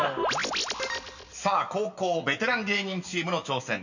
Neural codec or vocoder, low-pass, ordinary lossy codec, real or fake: none; 7.2 kHz; none; real